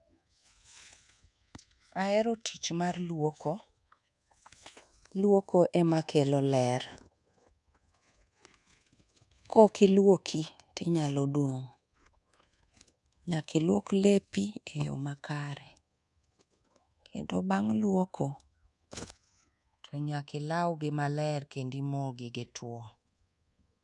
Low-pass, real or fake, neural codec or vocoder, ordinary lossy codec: 10.8 kHz; fake; codec, 24 kHz, 1.2 kbps, DualCodec; none